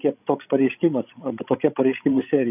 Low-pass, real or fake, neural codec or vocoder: 3.6 kHz; fake; codec, 16 kHz, 16 kbps, FreqCodec, larger model